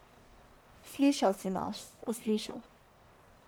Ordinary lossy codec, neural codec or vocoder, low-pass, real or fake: none; codec, 44.1 kHz, 1.7 kbps, Pupu-Codec; none; fake